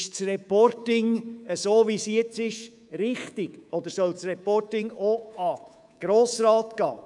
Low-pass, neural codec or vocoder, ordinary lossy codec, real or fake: 10.8 kHz; codec, 24 kHz, 3.1 kbps, DualCodec; none; fake